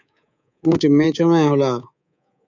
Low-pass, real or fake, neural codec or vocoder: 7.2 kHz; fake; codec, 24 kHz, 3.1 kbps, DualCodec